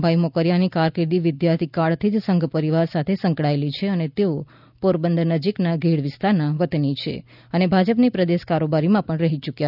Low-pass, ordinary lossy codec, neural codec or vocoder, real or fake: 5.4 kHz; none; none; real